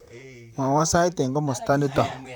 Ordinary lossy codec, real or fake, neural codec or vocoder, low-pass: none; fake; vocoder, 44.1 kHz, 128 mel bands, Pupu-Vocoder; none